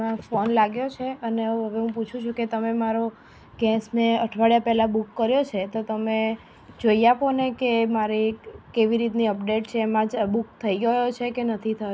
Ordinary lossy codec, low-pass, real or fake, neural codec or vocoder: none; none; real; none